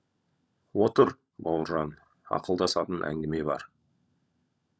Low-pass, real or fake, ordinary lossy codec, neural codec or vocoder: none; fake; none; codec, 16 kHz, 16 kbps, FunCodec, trained on LibriTTS, 50 frames a second